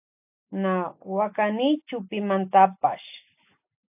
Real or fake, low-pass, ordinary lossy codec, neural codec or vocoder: real; 3.6 kHz; AAC, 32 kbps; none